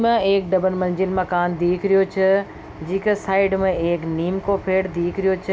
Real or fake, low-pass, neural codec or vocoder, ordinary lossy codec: real; none; none; none